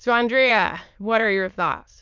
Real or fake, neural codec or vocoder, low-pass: fake; autoencoder, 22.05 kHz, a latent of 192 numbers a frame, VITS, trained on many speakers; 7.2 kHz